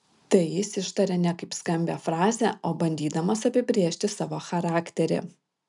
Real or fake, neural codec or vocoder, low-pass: real; none; 10.8 kHz